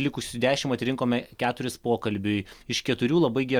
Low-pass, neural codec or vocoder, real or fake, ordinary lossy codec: 19.8 kHz; none; real; Opus, 64 kbps